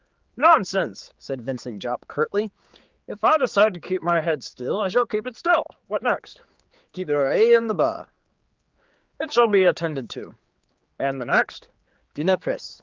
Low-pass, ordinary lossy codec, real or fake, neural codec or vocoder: 7.2 kHz; Opus, 32 kbps; fake; codec, 16 kHz, 4 kbps, X-Codec, HuBERT features, trained on general audio